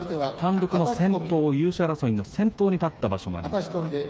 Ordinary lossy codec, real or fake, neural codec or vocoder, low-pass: none; fake; codec, 16 kHz, 4 kbps, FreqCodec, smaller model; none